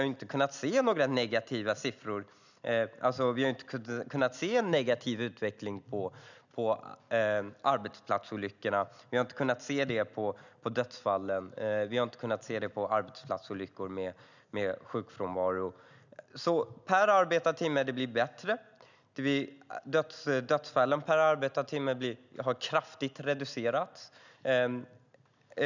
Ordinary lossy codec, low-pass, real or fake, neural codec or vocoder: none; 7.2 kHz; real; none